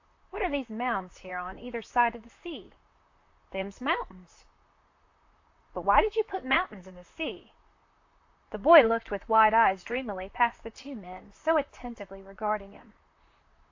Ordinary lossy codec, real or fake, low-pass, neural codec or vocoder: AAC, 48 kbps; fake; 7.2 kHz; vocoder, 44.1 kHz, 128 mel bands, Pupu-Vocoder